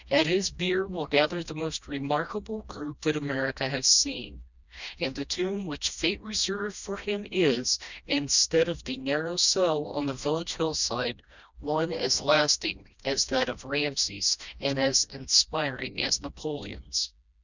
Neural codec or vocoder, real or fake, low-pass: codec, 16 kHz, 1 kbps, FreqCodec, smaller model; fake; 7.2 kHz